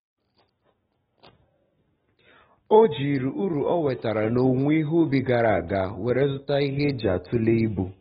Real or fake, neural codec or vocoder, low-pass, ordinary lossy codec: real; none; 7.2 kHz; AAC, 16 kbps